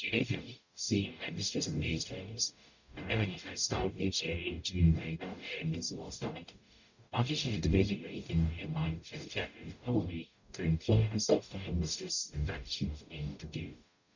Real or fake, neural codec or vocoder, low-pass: fake; codec, 44.1 kHz, 0.9 kbps, DAC; 7.2 kHz